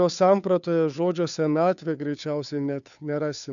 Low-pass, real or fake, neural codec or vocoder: 7.2 kHz; fake; codec, 16 kHz, 2 kbps, FunCodec, trained on Chinese and English, 25 frames a second